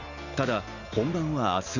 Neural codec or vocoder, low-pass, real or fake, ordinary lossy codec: none; 7.2 kHz; real; none